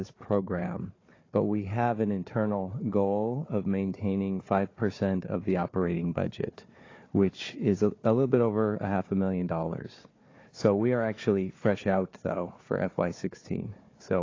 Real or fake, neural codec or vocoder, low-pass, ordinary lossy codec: fake; codec, 16 kHz, 4 kbps, FunCodec, trained on Chinese and English, 50 frames a second; 7.2 kHz; AAC, 32 kbps